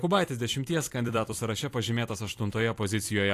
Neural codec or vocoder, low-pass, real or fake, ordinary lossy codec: vocoder, 44.1 kHz, 128 mel bands every 256 samples, BigVGAN v2; 14.4 kHz; fake; AAC, 64 kbps